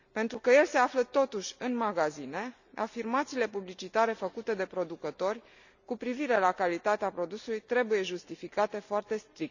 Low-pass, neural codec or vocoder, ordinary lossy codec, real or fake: 7.2 kHz; none; none; real